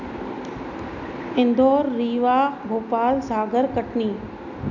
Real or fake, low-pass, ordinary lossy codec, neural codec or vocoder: real; 7.2 kHz; none; none